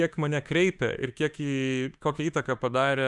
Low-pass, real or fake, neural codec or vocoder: 10.8 kHz; fake; autoencoder, 48 kHz, 32 numbers a frame, DAC-VAE, trained on Japanese speech